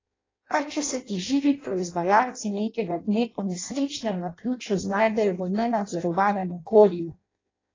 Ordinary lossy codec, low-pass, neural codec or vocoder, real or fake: AAC, 32 kbps; 7.2 kHz; codec, 16 kHz in and 24 kHz out, 0.6 kbps, FireRedTTS-2 codec; fake